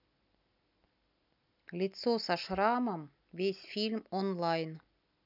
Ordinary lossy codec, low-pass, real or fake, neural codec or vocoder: none; 5.4 kHz; real; none